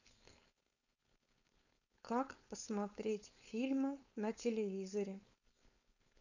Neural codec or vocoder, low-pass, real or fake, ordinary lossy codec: codec, 16 kHz, 4.8 kbps, FACodec; 7.2 kHz; fake; none